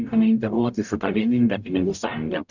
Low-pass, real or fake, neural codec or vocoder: 7.2 kHz; fake; codec, 44.1 kHz, 0.9 kbps, DAC